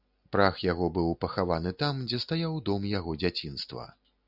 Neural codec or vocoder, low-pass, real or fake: none; 5.4 kHz; real